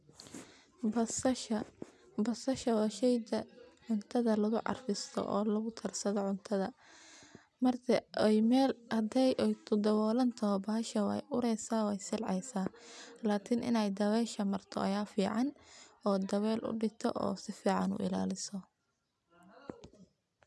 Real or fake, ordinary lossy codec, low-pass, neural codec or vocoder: real; none; none; none